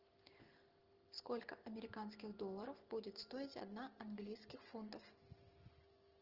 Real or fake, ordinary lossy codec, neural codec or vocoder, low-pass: real; Opus, 16 kbps; none; 5.4 kHz